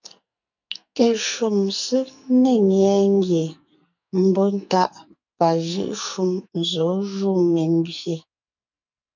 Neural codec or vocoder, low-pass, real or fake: codec, 44.1 kHz, 2.6 kbps, SNAC; 7.2 kHz; fake